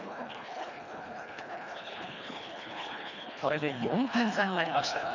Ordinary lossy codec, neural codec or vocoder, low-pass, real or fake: none; codec, 24 kHz, 1.5 kbps, HILCodec; 7.2 kHz; fake